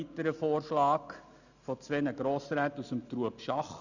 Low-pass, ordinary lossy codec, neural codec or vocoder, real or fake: 7.2 kHz; none; none; real